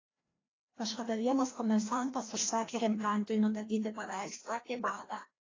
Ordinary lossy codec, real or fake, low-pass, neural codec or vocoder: AAC, 32 kbps; fake; 7.2 kHz; codec, 16 kHz, 1 kbps, FreqCodec, larger model